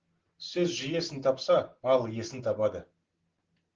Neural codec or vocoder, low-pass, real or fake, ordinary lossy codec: none; 7.2 kHz; real; Opus, 16 kbps